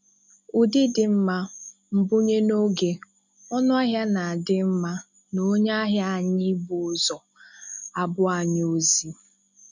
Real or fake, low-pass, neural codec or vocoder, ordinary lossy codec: real; 7.2 kHz; none; none